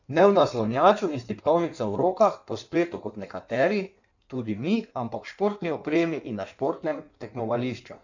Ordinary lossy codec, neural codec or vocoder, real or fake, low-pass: none; codec, 16 kHz in and 24 kHz out, 1.1 kbps, FireRedTTS-2 codec; fake; 7.2 kHz